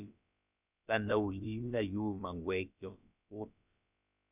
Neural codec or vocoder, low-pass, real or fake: codec, 16 kHz, about 1 kbps, DyCAST, with the encoder's durations; 3.6 kHz; fake